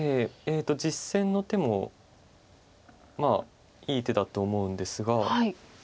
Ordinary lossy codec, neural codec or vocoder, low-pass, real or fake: none; none; none; real